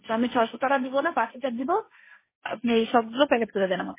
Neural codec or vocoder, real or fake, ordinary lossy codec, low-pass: codec, 16 kHz, 1.1 kbps, Voila-Tokenizer; fake; MP3, 16 kbps; 3.6 kHz